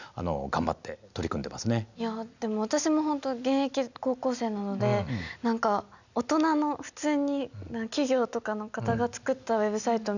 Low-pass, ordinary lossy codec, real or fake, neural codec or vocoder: 7.2 kHz; none; real; none